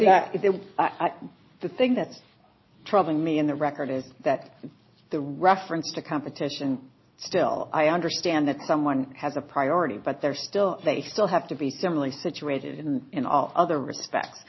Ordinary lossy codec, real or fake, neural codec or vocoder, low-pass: MP3, 24 kbps; real; none; 7.2 kHz